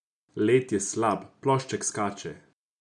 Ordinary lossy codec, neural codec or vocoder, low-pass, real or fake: none; none; 10.8 kHz; real